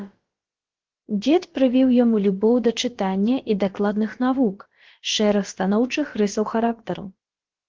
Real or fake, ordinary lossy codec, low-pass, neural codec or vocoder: fake; Opus, 16 kbps; 7.2 kHz; codec, 16 kHz, about 1 kbps, DyCAST, with the encoder's durations